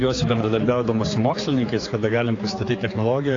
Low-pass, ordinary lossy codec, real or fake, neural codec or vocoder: 7.2 kHz; AAC, 32 kbps; fake; codec, 16 kHz, 4 kbps, X-Codec, HuBERT features, trained on general audio